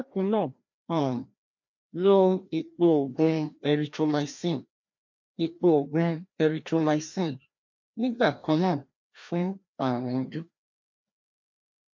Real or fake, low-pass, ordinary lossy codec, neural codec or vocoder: fake; 7.2 kHz; MP3, 48 kbps; codec, 16 kHz, 1 kbps, FreqCodec, larger model